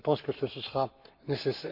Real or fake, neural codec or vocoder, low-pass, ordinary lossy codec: fake; codec, 44.1 kHz, 7.8 kbps, Pupu-Codec; 5.4 kHz; MP3, 48 kbps